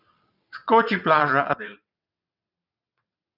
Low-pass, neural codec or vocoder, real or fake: 5.4 kHz; vocoder, 22.05 kHz, 80 mel bands, WaveNeXt; fake